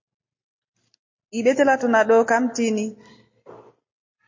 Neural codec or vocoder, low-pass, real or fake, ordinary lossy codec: none; 7.2 kHz; real; MP3, 32 kbps